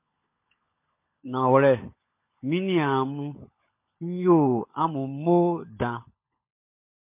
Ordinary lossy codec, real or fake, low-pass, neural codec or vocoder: MP3, 24 kbps; fake; 3.6 kHz; codec, 16 kHz, 16 kbps, FunCodec, trained on LibriTTS, 50 frames a second